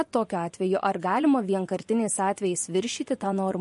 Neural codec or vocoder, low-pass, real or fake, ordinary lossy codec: vocoder, 24 kHz, 100 mel bands, Vocos; 10.8 kHz; fake; MP3, 48 kbps